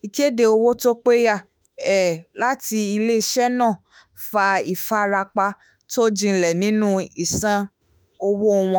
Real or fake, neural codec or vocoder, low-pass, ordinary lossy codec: fake; autoencoder, 48 kHz, 32 numbers a frame, DAC-VAE, trained on Japanese speech; none; none